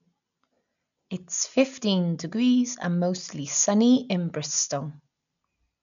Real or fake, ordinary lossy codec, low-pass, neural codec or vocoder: real; none; 7.2 kHz; none